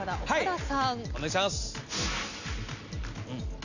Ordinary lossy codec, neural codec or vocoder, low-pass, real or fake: none; none; 7.2 kHz; real